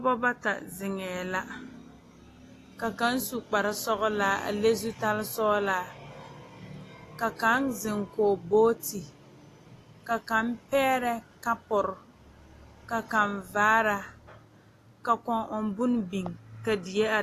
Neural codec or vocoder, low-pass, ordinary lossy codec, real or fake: none; 14.4 kHz; AAC, 48 kbps; real